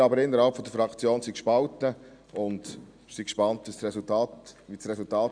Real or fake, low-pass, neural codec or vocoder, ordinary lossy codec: real; 9.9 kHz; none; none